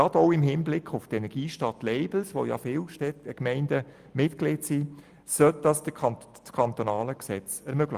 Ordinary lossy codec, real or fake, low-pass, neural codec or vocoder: Opus, 32 kbps; real; 14.4 kHz; none